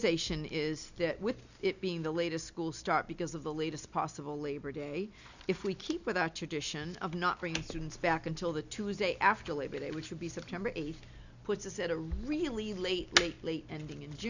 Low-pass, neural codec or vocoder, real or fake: 7.2 kHz; none; real